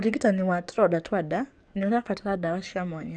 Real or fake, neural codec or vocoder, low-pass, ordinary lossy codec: fake; codec, 44.1 kHz, 7.8 kbps, DAC; 9.9 kHz; none